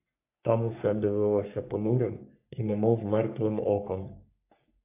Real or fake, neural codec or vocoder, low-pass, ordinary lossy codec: fake; codec, 44.1 kHz, 3.4 kbps, Pupu-Codec; 3.6 kHz; AAC, 24 kbps